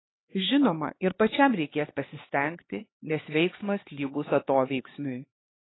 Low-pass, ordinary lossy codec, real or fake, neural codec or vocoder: 7.2 kHz; AAC, 16 kbps; fake; codec, 16 kHz, 4 kbps, X-Codec, HuBERT features, trained on LibriSpeech